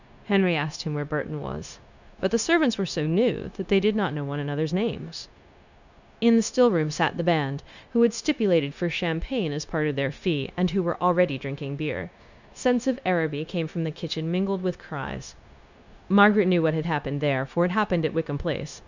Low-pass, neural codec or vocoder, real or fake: 7.2 kHz; codec, 16 kHz, 0.9 kbps, LongCat-Audio-Codec; fake